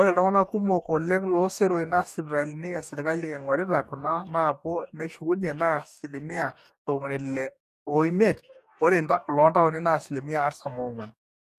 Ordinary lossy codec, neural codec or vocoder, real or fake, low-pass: none; codec, 44.1 kHz, 2.6 kbps, DAC; fake; 14.4 kHz